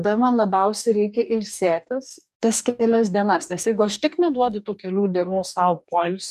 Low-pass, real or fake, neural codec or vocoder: 14.4 kHz; fake; codec, 44.1 kHz, 2.6 kbps, DAC